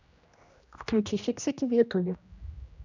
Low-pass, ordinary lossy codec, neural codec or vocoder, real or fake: 7.2 kHz; none; codec, 16 kHz, 1 kbps, X-Codec, HuBERT features, trained on general audio; fake